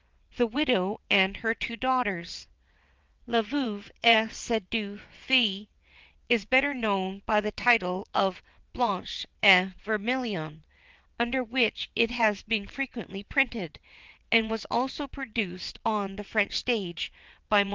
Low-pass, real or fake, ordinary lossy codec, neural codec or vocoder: 7.2 kHz; fake; Opus, 32 kbps; vocoder, 22.05 kHz, 80 mel bands, WaveNeXt